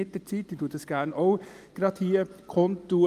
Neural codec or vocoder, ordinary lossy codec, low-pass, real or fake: none; Opus, 32 kbps; 14.4 kHz; real